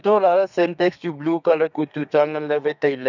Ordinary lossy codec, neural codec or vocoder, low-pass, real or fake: none; codec, 32 kHz, 1.9 kbps, SNAC; 7.2 kHz; fake